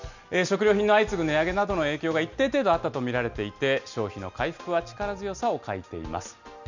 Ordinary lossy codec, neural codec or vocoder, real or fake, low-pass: none; none; real; 7.2 kHz